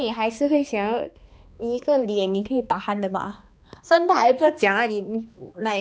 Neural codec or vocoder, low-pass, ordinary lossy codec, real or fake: codec, 16 kHz, 2 kbps, X-Codec, HuBERT features, trained on balanced general audio; none; none; fake